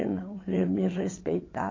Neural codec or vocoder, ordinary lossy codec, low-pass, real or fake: vocoder, 22.05 kHz, 80 mel bands, WaveNeXt; AAC, 32 kbps; 7.2 kHz; fake